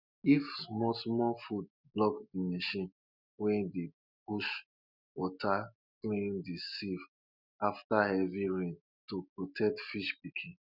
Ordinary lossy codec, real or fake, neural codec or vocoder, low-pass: none; real; none; 5.4 kHz